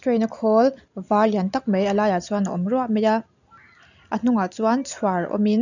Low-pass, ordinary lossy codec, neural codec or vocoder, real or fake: 7.2 kHz; none; none; real